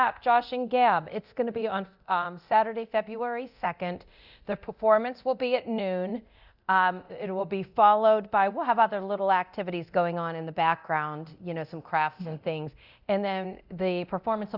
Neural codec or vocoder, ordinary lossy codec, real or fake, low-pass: codec, 24 kHz, 0.9 kbps, DualCodec; Opus, 64 kbps; fake; 5.4 kHz